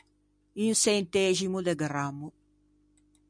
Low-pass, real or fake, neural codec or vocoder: 9.9 kHz; real; none